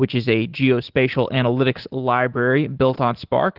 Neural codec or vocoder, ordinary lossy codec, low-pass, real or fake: none; Opus, 16 kbps; 5.4 kHz; real